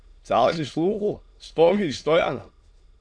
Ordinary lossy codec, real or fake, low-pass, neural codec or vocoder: MP3, 96 kbps; fake; 9.9 kHz; autoencoder, 22.05 kHz, a latent of 192 numbers a frame, VITS, trained on many speakers